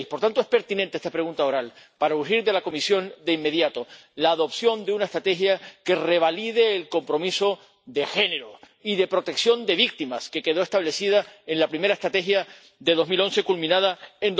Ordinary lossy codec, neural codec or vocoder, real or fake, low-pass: none; none; real; none